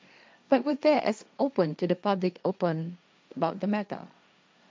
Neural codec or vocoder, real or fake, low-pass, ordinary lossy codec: codec, 16 kHz, 1.1 kbps, Voila-Tokenizer; fake; none; none